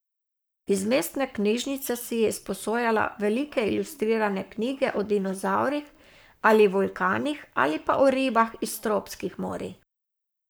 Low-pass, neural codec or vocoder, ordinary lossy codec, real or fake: none; codec, 44.1 kHz, 7.8 kbps, Pupu-Codec; none; fake